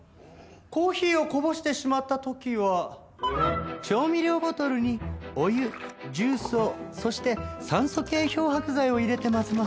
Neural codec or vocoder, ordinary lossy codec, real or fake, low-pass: none; none; real; none